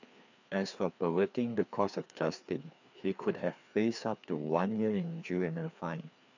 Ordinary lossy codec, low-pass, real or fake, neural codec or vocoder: none; 7.2 kHz; fake; codec, 16 kHz, 2 kbps, FreqCodec, larger model